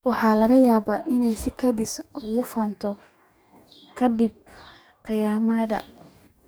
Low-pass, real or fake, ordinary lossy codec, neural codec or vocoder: none; fake; none; codec, 44.1 kHz, 2.6 kbps, DAC